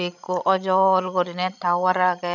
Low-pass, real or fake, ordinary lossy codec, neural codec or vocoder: 7.2 kHz; fake; none; codec, 16 kHz, 16 kbps, FreqCodec, larger model